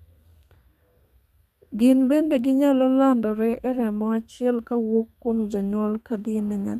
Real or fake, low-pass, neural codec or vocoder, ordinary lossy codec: fake; 14.4 kHz; codec, 32 kHz, 1.9 kbps, SNAC; none